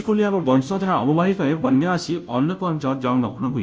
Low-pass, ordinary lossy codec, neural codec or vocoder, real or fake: none; none; codec, 16 kHz, 0.5 kbps, FunCodec, trained on Chinese and English, 25 frames a second; fake